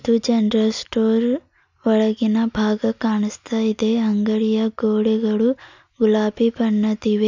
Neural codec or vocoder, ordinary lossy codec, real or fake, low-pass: none; none; real; 7.2 kHz